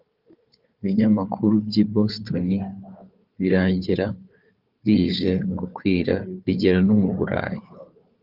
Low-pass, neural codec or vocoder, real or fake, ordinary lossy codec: 5.4 kHz; codec, 16 kHz, 4 kbps, FunCodec, trained on Chinese and English, 50 frames a second; fake; Opus, 24 kbps